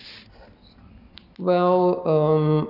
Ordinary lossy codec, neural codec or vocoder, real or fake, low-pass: none; none; real; 5.4 kHz